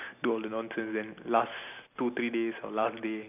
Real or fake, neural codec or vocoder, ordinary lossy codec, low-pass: real; none; none; 3.6 kHz